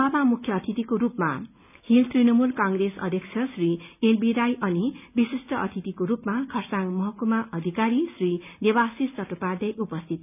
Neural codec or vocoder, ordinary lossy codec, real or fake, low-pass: none; none; real; 3.6 kHz